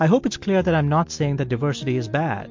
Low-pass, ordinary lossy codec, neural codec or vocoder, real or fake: 7.2 kHz; MP3, 48 kbps; none; real